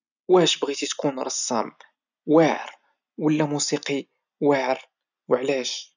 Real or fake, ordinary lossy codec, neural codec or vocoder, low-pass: real; none; none; 7.2 kHz